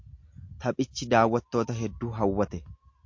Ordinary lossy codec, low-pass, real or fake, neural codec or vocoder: MP3, 48 kbps; 7.2 kHz; real; none